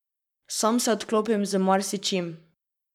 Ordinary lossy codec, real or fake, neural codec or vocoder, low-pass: none; fake; codec, 44.1 kHz, 7.8 kbps, Pupu-Codec; 19.8 kHz